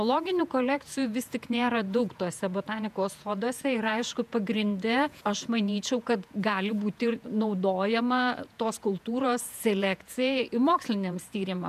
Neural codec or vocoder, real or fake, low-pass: vocoder, 48 kHz, 128 mel bands, Vocos; fake; 14.4 kHz